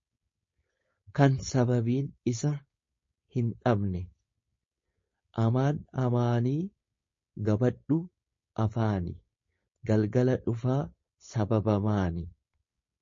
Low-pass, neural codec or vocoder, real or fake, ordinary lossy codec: 7.2 kHz; codec, 16 kHz, 4.8 kbps, FACodec; fake; MP3, 32 kbps